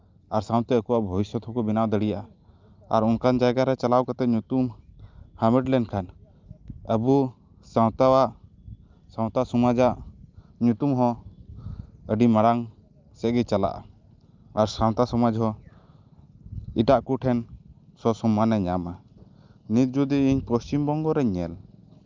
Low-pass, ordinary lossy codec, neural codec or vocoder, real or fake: 7.2 kHz; Opus, 24 kbps; none; real